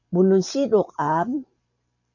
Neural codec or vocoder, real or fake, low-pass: vocoder, 44.1 kHz, 128 mel bands every 512 samples, BigVGAN v2; fake; 7.2 kHz